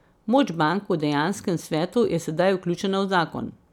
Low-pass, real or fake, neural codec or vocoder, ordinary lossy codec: 19.8 kHz; real; none; none